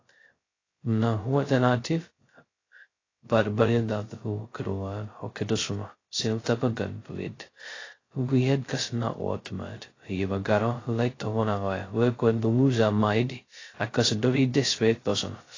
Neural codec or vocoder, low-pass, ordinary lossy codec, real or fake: codec, 16 kHz, 0.2 kbps, FocalCodec; 7.2 kHz; AAC, 32 kbps; fake